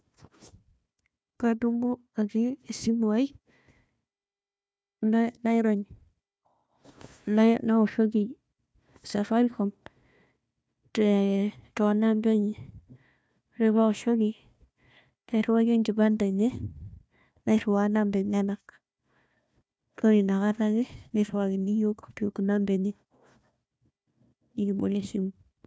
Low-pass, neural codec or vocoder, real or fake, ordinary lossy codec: none; codec, 16 kHz, 1 kbps, FunCodec, trained on Chinese and English, 50 frames a second; fake; none